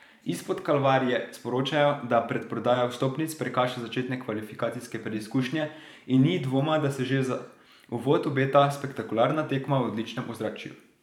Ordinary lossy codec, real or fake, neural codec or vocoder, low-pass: none; fake; vocoder, 44.1 kHz, 128 mel bands every 512 samples, BigVGAN v2; 19.8 kHz